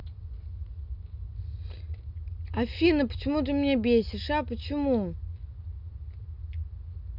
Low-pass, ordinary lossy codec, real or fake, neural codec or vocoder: 5.4 kHz; none; real; none